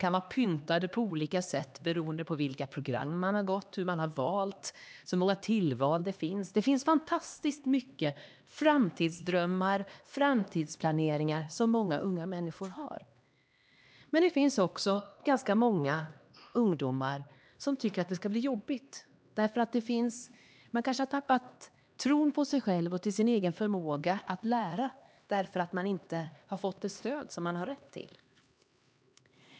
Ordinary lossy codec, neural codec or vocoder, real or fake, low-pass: none; codec, 16 kHz, 2 kbps, X-Codec, HuBERT features, trained on LibriSpeech; fake; none